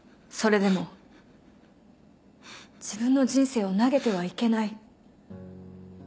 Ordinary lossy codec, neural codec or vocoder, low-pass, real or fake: none; none; none; real